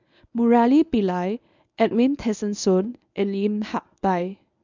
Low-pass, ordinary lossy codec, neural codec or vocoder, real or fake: 7.2 kHz; none; codec, 24 kHz, 0.9 kbps, WavTokenizer, medium speech release version 1; fake